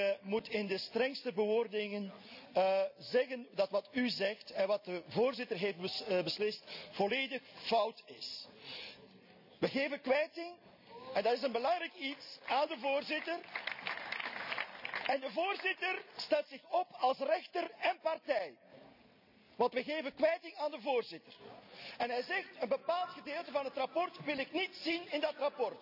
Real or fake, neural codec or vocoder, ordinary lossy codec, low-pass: real; none; none; 5.4 kHz